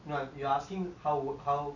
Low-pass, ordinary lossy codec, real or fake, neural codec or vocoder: 7.2 kHz; none; real; none